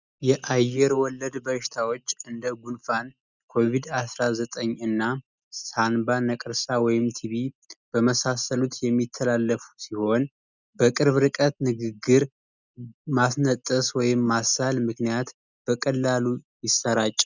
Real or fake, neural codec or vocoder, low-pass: real; none; 7.2 kHz